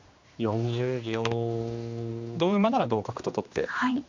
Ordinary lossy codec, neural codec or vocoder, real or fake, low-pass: MP3, 64 kbps; codec, 16 kHz, 2 kbps, X-Codec, HuBERT features, trained on general audio; fake; 7.2 kHz